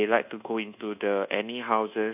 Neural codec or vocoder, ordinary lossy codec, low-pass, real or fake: codec, 24 kHz, 1.2 kbps, DualCodec; none; 3.6 kHz; fake